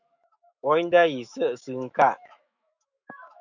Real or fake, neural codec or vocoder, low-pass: fake; autoencoder, 48 kHz, 128 numbers a frame, DAC-VAE, trained on Japanese speech; 7.2 kHz